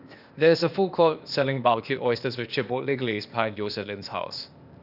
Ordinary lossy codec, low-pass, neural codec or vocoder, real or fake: none; 5.4 kHz; codec, 16 kHz, 0.8 kbps, ZipCodec; fake